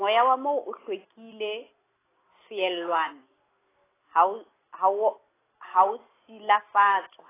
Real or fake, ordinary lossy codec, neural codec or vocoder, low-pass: real; AAC, 16 kbps; none; 3.6 kHz